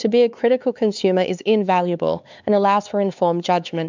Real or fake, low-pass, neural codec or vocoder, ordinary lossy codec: fake; 7.2 kHz; codec, 16 kHz, 4 kbps, X-Codec, HuBERT features, trained on LibriSpeech; MP3, 64 kbps